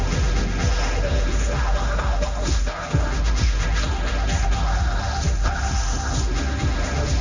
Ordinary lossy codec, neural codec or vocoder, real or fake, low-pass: none; codec, 16 kHz, 1.1 kbps, Voila-Tokenizer; fake; 7.2 kHz